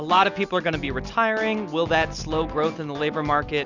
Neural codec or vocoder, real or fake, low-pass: none; real; 7.2 kHz